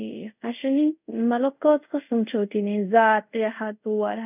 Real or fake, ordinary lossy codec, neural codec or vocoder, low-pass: fake; none; codec, 24 kHz, 0.5 kbps, DualCodec; 3.6 kHz